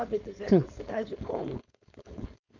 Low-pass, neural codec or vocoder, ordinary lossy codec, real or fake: 7.2 kHz; codec, 16 kHz, 4.8 kbps, FACodec; none; fake